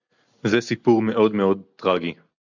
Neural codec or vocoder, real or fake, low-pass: none; real; 7.2 kHz